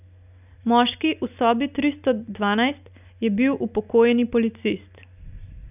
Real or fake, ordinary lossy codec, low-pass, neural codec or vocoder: real; none; 3.6 kHz; none